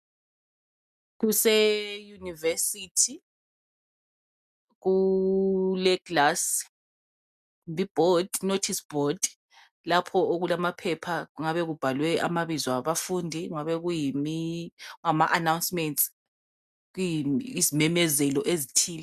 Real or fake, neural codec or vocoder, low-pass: real; none; 14.4 kHz